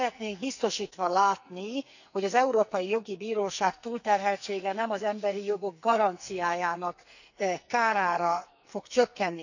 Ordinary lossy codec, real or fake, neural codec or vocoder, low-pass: none; fake; codec, 44.1 kHz, 2.6 kbps, SNAC; 7.2 kHz